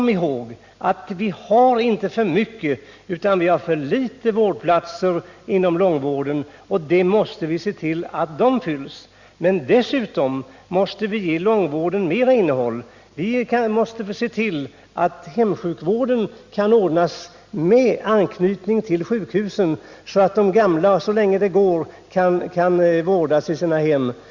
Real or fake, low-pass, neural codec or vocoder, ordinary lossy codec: real; 7.2 kHz; none; Opus, 64 kbps